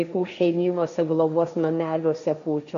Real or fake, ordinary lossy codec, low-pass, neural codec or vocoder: fake; AAC, 96 kbps; 7.2 kHz; codec, 16 kHz, 1.1 kbps, Voila-Tokenizer